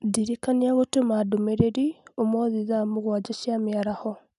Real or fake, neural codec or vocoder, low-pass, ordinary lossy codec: real; none; 10.8 kHz; none